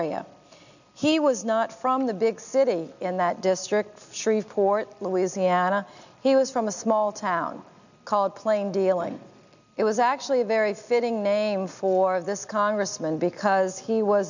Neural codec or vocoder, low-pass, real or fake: none; 7.2 kHz; real